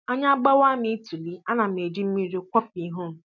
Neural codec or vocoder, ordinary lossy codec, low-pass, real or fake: none; none; 7.2 kHz; real